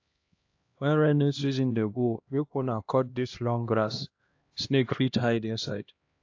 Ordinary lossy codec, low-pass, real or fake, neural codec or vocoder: AAC, 48 kbps; 7.2 kHz; fake; codec, 16 kHz, 1 kbps, X-Codec, HuBERT features, trained on LibriSpeech